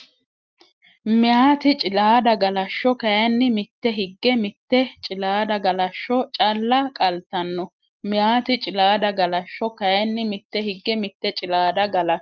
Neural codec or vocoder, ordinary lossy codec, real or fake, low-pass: none; Opus, 32 kbps; real; 7.2 kHz